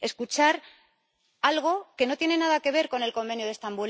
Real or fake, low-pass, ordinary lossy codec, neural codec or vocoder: real; none; none; none